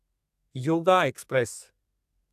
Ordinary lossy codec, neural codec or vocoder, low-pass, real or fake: AAC, 96 kbps; codec, 32 kHz, 1.9 kbps, SNAC; 14.4 kHz; fake